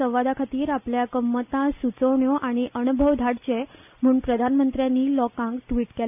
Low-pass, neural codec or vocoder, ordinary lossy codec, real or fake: 3.6 kHz; none; none; real